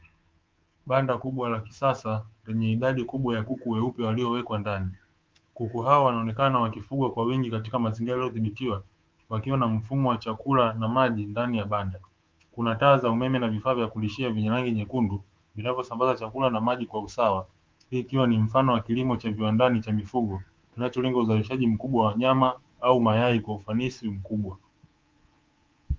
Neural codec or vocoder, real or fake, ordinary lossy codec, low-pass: codec, 24 kHz, 3.1 kbps, DualCodec; fake; Opus, 32 kbps; 7.2 kHz